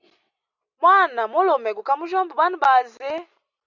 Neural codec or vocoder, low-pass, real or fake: none; 7.2 kHz; real